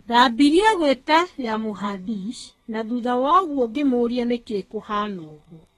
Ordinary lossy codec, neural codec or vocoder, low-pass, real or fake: AAC, 32 kbps; codec, 32 kHz, 1.9 kbps, SNAC; 14.4 kHz; fake